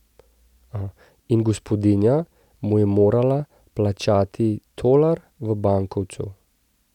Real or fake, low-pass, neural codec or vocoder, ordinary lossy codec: real; 19.8 kHz; none; none